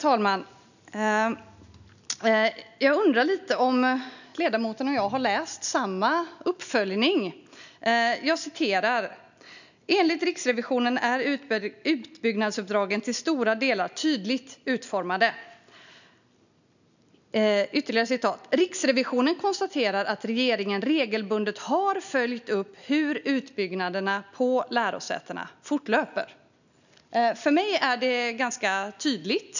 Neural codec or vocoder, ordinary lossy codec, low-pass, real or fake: none; none; 7.2 kHz; real